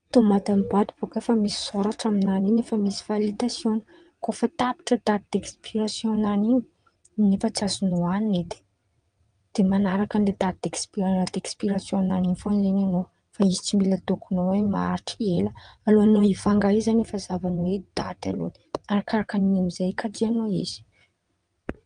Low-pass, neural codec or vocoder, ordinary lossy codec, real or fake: 9.9 kHz; vocoder, 22.05 kHz, 80 mel bands, WaveNeXt; Opus, 32 kbps; fake